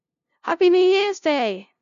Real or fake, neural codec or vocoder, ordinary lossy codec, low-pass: fake; codec, 16 kHz, 0.5 kbps, FunCodec, trained on LibriTTS, 25 frames a second; none; 7.2 kHz